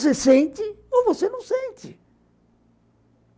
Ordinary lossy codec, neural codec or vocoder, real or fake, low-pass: none; none; real; none